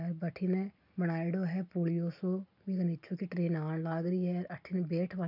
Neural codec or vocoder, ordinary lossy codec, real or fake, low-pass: none; none; real; 5.4 kHz